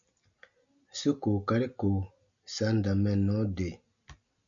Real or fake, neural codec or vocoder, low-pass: real; none; 7.2 kHz